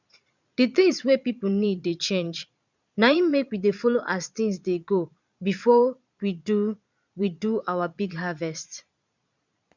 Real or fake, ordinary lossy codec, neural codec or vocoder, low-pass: fake; none; vocoder, 22.05 kHz, 80 mel bands, Vocos; 7.2 kHz